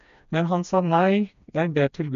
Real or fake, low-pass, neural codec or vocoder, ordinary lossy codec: fake; 7.2 kHz; codec, 16 kHz, 2 kbps, FreqCodec, smaller model; none